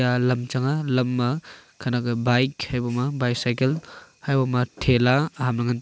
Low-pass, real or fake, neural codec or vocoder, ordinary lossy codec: none; real; none; none